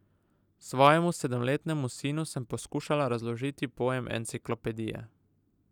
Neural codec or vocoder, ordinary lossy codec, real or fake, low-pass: none; none; real; 19.8 kHz